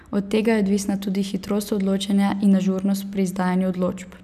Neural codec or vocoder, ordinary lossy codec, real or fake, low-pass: none; none; real; 14.4 kHz